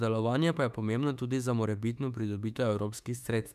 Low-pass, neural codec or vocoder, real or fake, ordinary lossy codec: 14.4 kHz; autoencoder, 48 kHz, 32 numbers a frame, DAC-VAE, trained on Japanese speech; fake; none